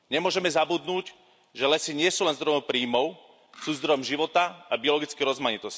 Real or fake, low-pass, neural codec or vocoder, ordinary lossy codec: real; none; none; none